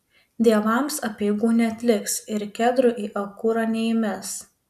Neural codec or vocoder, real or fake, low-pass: none; real; 14.4 kHz